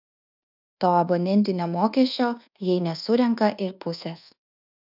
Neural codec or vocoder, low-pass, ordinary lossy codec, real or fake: codec, 24 kHz, 1.2 kbps, DualCodec; 5.4 kHz; AAC, 48 kbps; fake